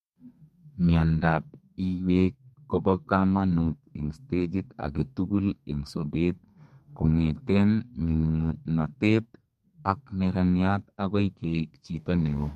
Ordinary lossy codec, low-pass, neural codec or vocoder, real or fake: MP3, 64 kbps; 14.4 kHz; codec, 32 kHz, 1.9 kbps, SNAC; fake